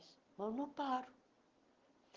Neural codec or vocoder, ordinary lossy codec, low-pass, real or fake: none; Opus, 16 kbps; 7.2 kHz; real